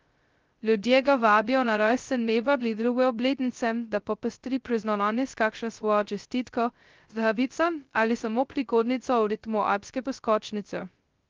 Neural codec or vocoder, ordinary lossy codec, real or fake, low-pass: codec, 16 kHz, 0.2 kbps, FocalCodec; Opus, 32 kbps; fake; 7.2 kHz